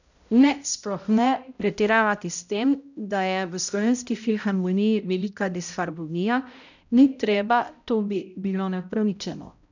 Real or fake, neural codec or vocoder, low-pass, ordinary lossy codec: fake; codec, 16 kHz, 0.5 kbps, X-Codec, HuBERT features, trained on balanced general audio; 7.2 kHz; none